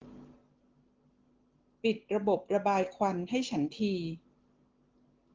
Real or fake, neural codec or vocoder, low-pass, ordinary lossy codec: real; none; 7.2 kHz; Opus, 16 kbps